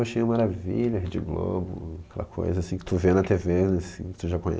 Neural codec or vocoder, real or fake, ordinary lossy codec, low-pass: none; real; none; none